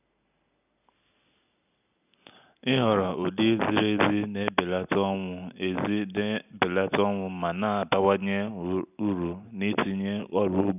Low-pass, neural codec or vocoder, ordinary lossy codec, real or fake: 3.6 kHz; none; none; real